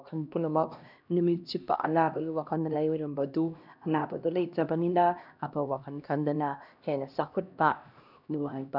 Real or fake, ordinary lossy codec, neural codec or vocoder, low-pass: fake; none; codec, 16 kHz, 1 kbps, X-Codec, HuBERT features, trained on LibriSpeech; 5.4 kHz